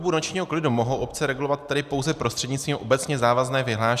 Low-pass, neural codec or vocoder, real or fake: 14.4 kHz; none; real